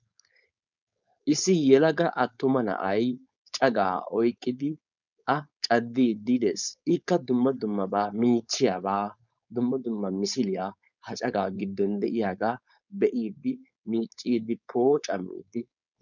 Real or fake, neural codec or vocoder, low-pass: fake; codec, 16 kHz, 4.8 kbps, FACodec; 7.2 kHz